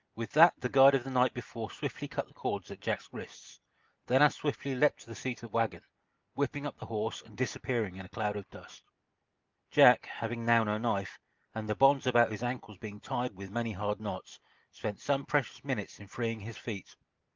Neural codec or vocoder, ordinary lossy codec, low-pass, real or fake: none; Opus, 24 kbps; 7.2 kHz; real